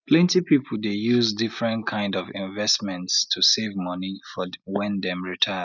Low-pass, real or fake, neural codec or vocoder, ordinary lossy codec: 7.2 kHz; real; none; none